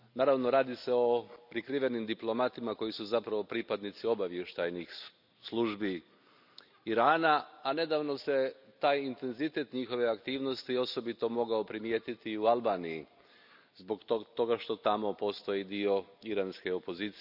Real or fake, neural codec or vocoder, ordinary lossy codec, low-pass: real; none; none; 5.4 kHz